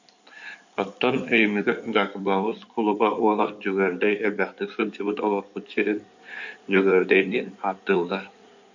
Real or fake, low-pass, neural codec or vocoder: fake; 7.2 kHz; codec, 16 kHz in and 24 kHz out, 2.2 kbps, FireRedTTS-2 codec